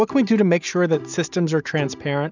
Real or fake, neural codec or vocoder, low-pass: real; none; 7.2 kHz